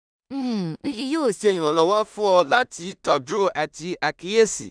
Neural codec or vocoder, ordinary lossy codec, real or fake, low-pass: codec, 16 kHz in and 24 kHz out, 0.4 kbps, LongCat-Audio-Codec, two codebook decoder; none; fake; 9.9 kHz